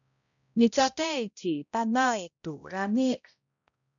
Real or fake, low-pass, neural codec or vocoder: fake; 7.2 kHz; codec, 16 kHz, 0.5 kbps, X-Codec, HuBERT features, trained on balanced general audio